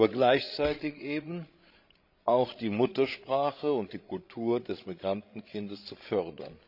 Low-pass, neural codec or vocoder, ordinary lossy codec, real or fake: 5.4 kHz; codec, 16 kHz, 16 kbps, FreqCodec, larger model; none; fake